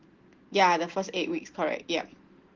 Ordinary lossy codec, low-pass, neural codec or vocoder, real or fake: Opus, 16 kbps; 7.2 kHz; none; real